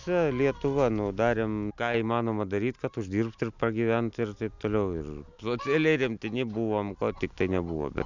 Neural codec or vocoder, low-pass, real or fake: none; 7.2 kHz; real